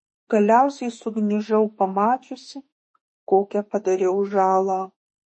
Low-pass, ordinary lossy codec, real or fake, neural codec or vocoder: 10.8 kHz; MP3, 32 kbps; fake; autoencoder, 48 kHz, 32 numbers a frame, DAC-VAE, trained on Japanese speech